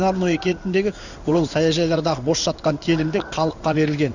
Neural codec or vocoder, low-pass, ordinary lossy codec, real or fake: codec, 16 kHz in and 24 kHz out, 1 kbps, XY-Tokenizer; 7.2 kHz; none; fake